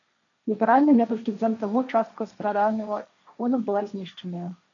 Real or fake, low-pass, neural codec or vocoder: fake; 7.2 kHz; codec, 16 kHz, 1.1 kbps, Voila-Tokenizer